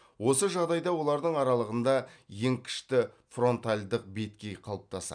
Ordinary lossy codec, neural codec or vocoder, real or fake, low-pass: none; none; real; 9.9 kHz